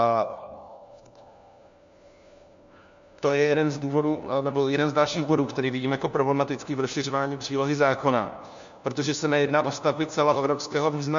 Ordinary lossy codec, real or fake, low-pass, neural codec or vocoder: MP3, 64 kbps; fake; 7.2 kHz; codec, 16 kHz, 1 kbps, FunCodec, trained on LibriTTS, 50 frames a second